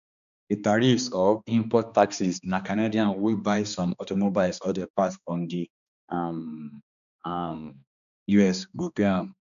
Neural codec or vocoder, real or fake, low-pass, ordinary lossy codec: codec, 16 kHz, 2 kbps, X-Codec, HuBERT features, trained on balanced general audio; fake; 7.2 kHz; none